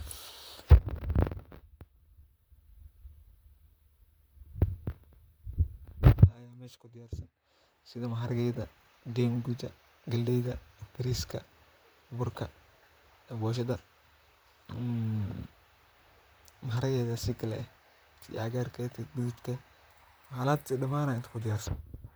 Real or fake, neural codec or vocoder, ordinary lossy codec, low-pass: fake; vocoder, 44.1 kHz, 128 mel bands, Pupu-Vocoder; none; none